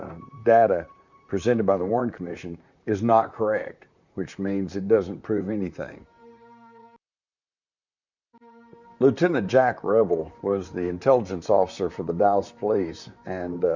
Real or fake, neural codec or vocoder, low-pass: fake; vocoder, 44.1 kHz, 128 mel bands, Pupu-Vocoder; 7.2 kHz